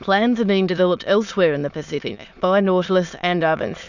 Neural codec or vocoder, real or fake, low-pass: autoencoder, 22.05 kHz, a latent of 192 numbers a frame, VITS, trained on many speakers; fake; 7.2 kHz